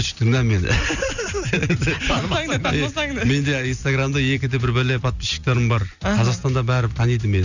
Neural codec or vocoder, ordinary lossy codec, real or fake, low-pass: none; none; real; 7.2 kHz